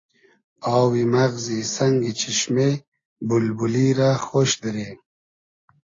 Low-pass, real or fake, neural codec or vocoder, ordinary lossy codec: 7.2 kHz; real; none; AAC, 32 kbps